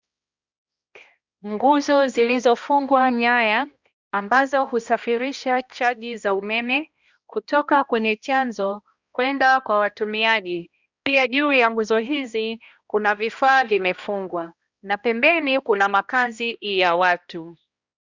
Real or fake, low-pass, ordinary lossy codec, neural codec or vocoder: fake; 7.2 kHz; Opus, 64 kbps; codec, 16 kHz, 1 kbps, X-Codec, HuBERT features, trained on balanced general audio